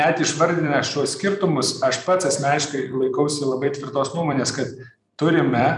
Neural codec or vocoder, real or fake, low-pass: vocoder, 44.1 kHz, 128 mel bands every 256 samples, BigVGAN v2; fake; 10.8 kHz